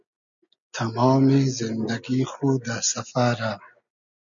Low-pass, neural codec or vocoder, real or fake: 7.2 kHz; none; real